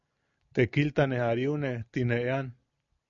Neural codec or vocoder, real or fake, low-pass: none; real; 7.2 kHz